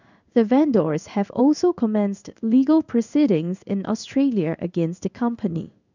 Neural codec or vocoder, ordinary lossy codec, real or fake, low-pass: codec, 16 kHz in and 24 kHz out, 1 kbps, XY-Tokenizer; none; fake; 7.2 kHz